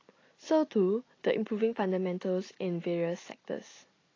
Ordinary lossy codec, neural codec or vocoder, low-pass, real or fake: AAC, 32 kbps; none; 7.2 kHz; real